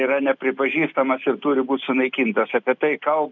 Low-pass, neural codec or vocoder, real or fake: 7.2 kHz; none; real